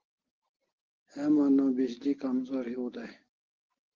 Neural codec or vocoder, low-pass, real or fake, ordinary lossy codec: none; 7.2 kHz; real; Opus, 16 kbps